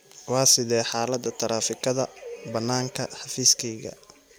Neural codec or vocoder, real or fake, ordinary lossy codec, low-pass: none; real; none; none